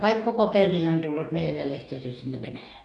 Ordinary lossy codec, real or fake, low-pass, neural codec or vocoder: none; fake; 10.8 kHz; codec, 44.1 kHz, 2.6 kbps, DAC